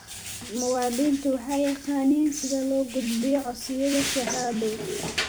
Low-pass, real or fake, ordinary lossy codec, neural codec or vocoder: none; fake; none; vocoder, 44.1 kHz, 128 mel bands every 256 samples, BigVGAN v2